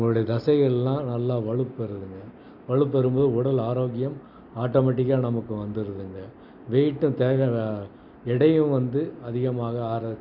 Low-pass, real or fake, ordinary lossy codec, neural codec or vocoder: 5.4 kHz; real; none; none